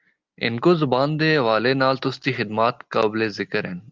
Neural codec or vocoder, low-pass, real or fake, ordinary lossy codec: none; 7.2 kHz; real; Opus, 24 kbps